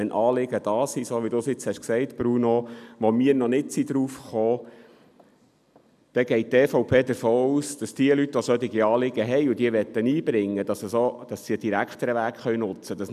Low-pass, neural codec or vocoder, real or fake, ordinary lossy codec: 14.4 kHz; none; real; none